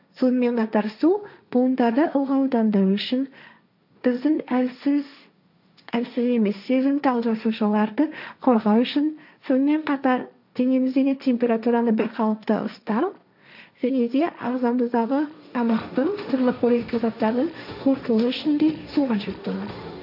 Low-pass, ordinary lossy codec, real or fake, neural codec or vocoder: 5.4 kHz; none; fake; codec, 16 kHz, 1.1 kbps, Voila-Tokenizer